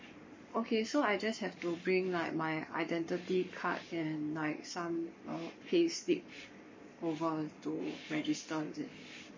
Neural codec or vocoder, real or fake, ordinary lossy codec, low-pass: codec, 44.1 kHz, 7.8 kbps, Pupu-Codec; fake; MP3, 32 kbps; 7.2 kHz